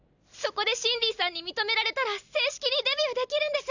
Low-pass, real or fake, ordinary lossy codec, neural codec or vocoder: 7.2 kHz; real; none; none